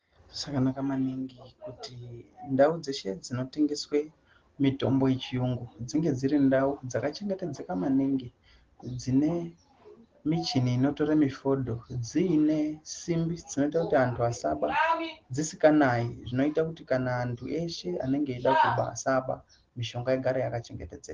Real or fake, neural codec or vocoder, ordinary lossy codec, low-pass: real; none; Opus, 16 kbps; 7.2 kHz